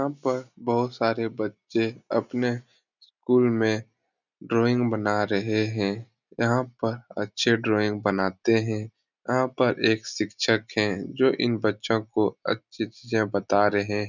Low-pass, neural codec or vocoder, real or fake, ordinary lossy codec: 7.2 kHz; none; real; none